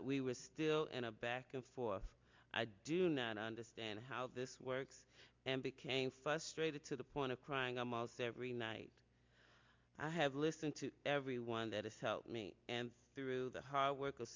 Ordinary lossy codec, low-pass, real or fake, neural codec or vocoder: MP3, 64 kbps; 7.2 kHz; real; none